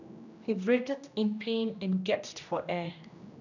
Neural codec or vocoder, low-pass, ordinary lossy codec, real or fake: codec, 16 kHz, 1 kbps, X-Codec, HuBERT features, trained on general audio; 7.2 kHz; none; fake